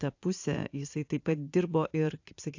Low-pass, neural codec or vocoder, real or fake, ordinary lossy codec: 7.2 kHz; vocoder, 44.1 kHz, 80 mel bands, Vocos; fake; AAC, 48 kbps